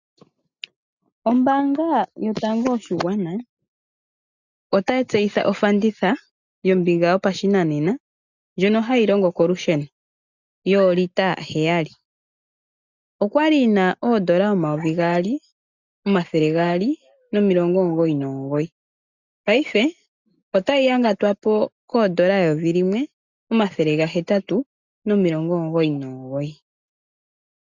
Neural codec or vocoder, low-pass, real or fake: none; 7.2 kHz; real